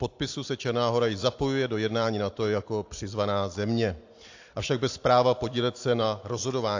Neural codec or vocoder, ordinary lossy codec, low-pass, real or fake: none; AAC, 48 kbps; 7.2 kHz; real